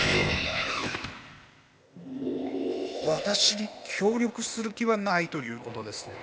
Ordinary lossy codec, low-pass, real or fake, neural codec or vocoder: none; none; fake; codec, 16 kHz, 0.8 kbps, ZipCodec